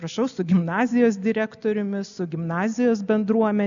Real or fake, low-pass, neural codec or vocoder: real; 7.2 kHz; none